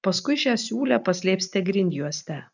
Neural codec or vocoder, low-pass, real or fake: none; 7.2 kHz; real